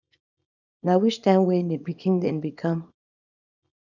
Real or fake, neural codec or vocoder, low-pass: fake; codec, 24 kHz, 0.9 kbps, WavTokenizer, small release; 7.2 kHz